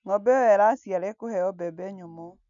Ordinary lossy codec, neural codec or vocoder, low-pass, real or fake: MP3, 96 kbps; none; 7.2 kHz; real